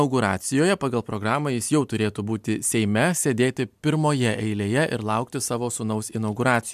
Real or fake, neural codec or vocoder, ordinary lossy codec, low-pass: real; none; MP3, 96 kbps; 14.4 kHz